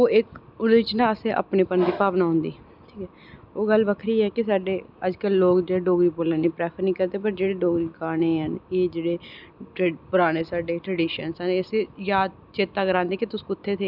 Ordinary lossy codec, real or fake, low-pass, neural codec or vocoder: none; real; 5.4 kHz; none